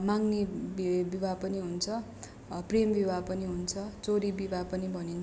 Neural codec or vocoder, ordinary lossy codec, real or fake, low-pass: none; none; real; none